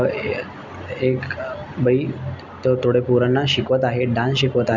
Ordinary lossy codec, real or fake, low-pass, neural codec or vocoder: none; real; 7.2 kHz; none